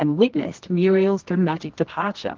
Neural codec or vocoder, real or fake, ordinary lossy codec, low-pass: codec, 24 kHz, 0.9 kbps, WavTokenizer, medium music audio release; fake; Opus, 16 kbps; 7.2 kHz